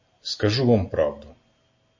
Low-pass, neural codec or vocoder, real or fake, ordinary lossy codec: 7.2 kHz; none; real; MP3, 32 kbps